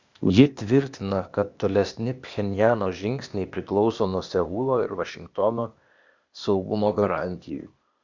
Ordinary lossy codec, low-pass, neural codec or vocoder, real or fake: Opus, 64 kbps; 7.2 kHz; codec, 16 kHz, 0.8 kbps, ZipCodec; fake